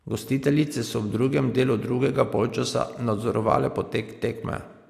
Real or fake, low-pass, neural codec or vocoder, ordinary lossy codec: real; 14.4 kHz; none; AAC, 64 kbps